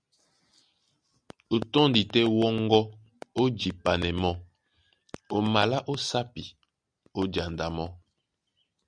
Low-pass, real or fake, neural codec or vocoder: 9.9 kHz; real; none